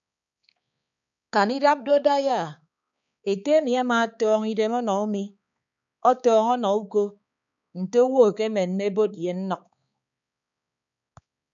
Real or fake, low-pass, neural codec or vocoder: fake; 7.2 kHz; codec, 16 kHz, 4 kbps, X-Codec, HuBERT features, trained on balanced general audio